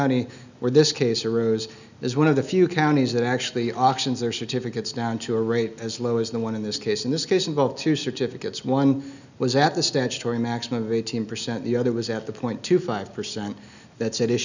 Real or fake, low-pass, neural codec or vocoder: real; 7.2 kHz; none